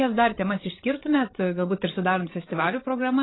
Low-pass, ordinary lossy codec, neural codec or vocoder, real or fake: 7.2 kHz; AAC, 16 kbps; codec, 16 kHz, 16 kbps, FreqCodec, larger model; fake